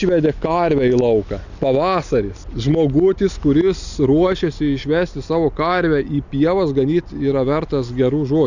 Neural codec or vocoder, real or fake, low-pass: none; real; 7.2 kHz